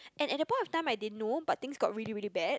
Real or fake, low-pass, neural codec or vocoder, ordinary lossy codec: real; none; none; none